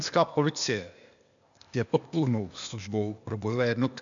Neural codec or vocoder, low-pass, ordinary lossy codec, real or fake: codec, 16 kHz, 0.8 kbps, ZipCodec; 7.2 kHz; MP3, 64 kbps; fake